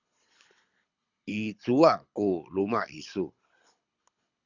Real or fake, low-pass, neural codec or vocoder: fake; 7.2 kHz; codec, 24 kHz, 6 kbps, HILCodec